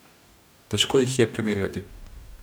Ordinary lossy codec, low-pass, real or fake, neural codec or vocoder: none; none; fake; codec, 44.1 kHz, 2.6 kbps, DAC